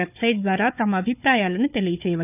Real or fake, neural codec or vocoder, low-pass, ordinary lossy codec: fake; codec, 16 kHz, 4 kbps, FunCodec, trained on Chinese and English, 50 frames a second; 3.6 kHz; none